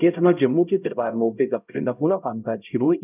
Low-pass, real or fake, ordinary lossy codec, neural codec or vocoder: 3.6 kHz; fake; none; codec, 16 kHz, 0.5 kbps, X-Codec, HuBERT features, trained on LibriSpeech